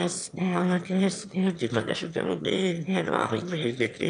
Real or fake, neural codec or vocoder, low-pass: fake; autoencoder, 22.05 kHz, a latent of 192 numbers a frame, VITS, trained on one speaker; 9.9 kHz